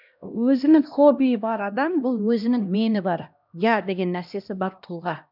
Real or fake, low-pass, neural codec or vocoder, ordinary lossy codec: fake; 5.4 kHz; codec, 16 kHz, 1 kbps, X-Codec, HuBERT features, trained on LibriSpeech; none